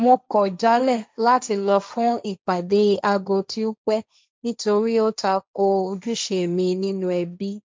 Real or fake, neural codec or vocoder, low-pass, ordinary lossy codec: fake; codec, 16 kHz, 1.1 kbps, Voila-Tokenizer; none; none